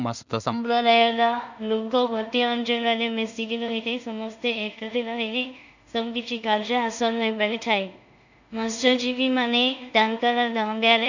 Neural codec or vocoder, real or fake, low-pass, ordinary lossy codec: codec, 16 kHz in and 24 kHz out, 0.4 kbps, LongCat-Audio-Codec, two codebook decoder; fake; 7.2 kHz; none